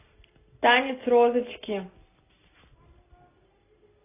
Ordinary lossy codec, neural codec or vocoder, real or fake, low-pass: AAC, 16 kbps; none; real; 3.6 kHz